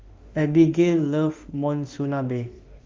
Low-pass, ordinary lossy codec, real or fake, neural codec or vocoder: 7.2 kHz; Opus, 32 kbps; fake; autoencoder, 48 kHz, 32 numbers a frame, DAC-VAE, trained on Japanese speech